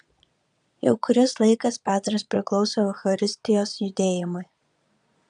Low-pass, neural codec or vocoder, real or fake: 9.9 kHz; vocoder, 22.05 kHz, 80 mel bands, Vocos; fake